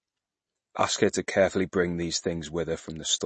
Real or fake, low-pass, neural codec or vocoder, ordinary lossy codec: real; 10.8 kHz; none; MP3, 32 kbps